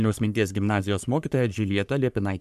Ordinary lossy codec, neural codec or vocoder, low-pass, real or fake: MP3, 96 kbps; codec, 44.1 kHz, 3.4 kbps, Pupu-Codec; 14.4 kHz; fake